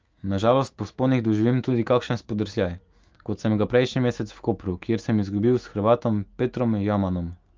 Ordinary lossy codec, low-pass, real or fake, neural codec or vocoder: Opus, 24 kbps; 7.2 kHz; fake; autoencoder, 48 kHz, 128 numbers a frame, DAC-VAE, trained on Japanese speech